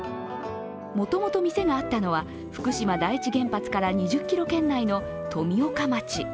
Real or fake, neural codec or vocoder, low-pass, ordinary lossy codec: real; none; none; none